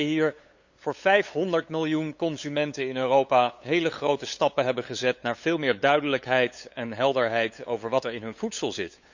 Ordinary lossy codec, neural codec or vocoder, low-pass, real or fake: Opus, 64 kbps; codec, 16 kHz, 8 kbps, FunCodec, trained on LibriTTS, 25 frames a second; 7.2 kHz; fake